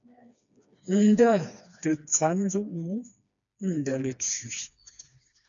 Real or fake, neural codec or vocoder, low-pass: fake; codec, 16 kHz, 2 kbps, FreqCodec, smaller model; 7.2 kHz